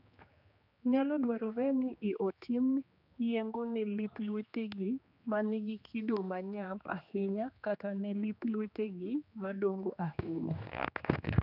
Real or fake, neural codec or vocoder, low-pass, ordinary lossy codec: fake; codec, 16 kHz, 2 kbps, X-Codec, HuBERT features, trained on general audio; 5.4 kHz; none